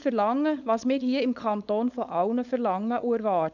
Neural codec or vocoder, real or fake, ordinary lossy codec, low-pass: codec, 16 kHz, 4.8 kbps, FACodec; fake; none; 7.2 kHz